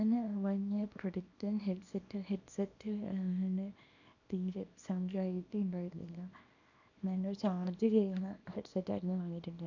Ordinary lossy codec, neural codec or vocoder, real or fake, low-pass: none; codec, 24 kHz, 0.9 kbps, WavTokenizer, small release; fake; 7.2 kHz